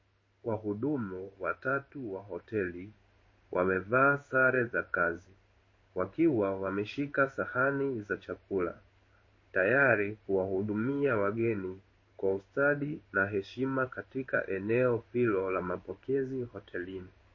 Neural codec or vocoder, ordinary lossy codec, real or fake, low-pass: codec, 16 kHz in and 24 kHz out, 1 kbps, XY-Tokenizer; MP3, 32 kbps; fake; 7.2 kHz